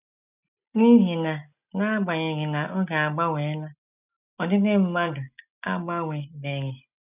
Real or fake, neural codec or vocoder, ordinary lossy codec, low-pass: real; none; none; 3.6 kHz